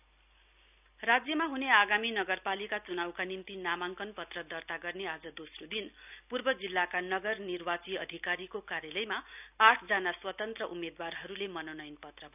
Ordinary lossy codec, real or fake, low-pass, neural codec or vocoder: none; real; 3.6 kHz; none